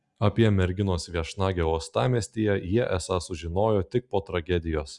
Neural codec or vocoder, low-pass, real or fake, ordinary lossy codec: none; 10.8 kHz; real; Opus, 64 kbps